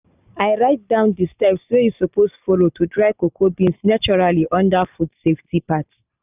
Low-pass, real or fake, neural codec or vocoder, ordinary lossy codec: 3.6 kHz; real; none; none